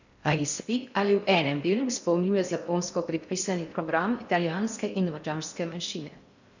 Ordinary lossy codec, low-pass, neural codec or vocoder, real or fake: none; 7.2 kHz; codec, 16 kHz in and 24 kHz out, 0.6 kbps, FocalCodec, streaming, 4096 codes; fake